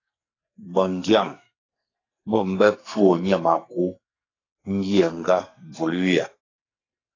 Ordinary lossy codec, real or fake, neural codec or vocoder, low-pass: AAC, 32 kbps; fake; codec, 44.1 kHz, 2.6 kbps, SNAC; 7.2 kHz